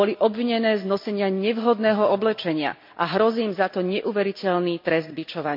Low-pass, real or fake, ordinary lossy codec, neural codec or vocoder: 5.4 kHz; real; MP3, 48 kbps; none